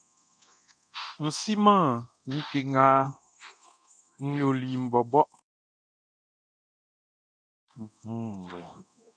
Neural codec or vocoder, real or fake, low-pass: codec, 24 kHz, 0.9 kbps, DualCodec; fake; 9.9 kHz